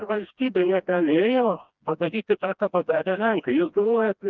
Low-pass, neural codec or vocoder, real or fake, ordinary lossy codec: 7.2 kHz; codec, 16 kHz, 1 kbps, FreqCodec, smaller model; fake; Opus, 24 kbps